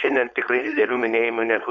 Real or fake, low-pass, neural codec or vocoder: fake; 7.2 kHz; codec, 16 kHz, 4.8 kbps, FACodec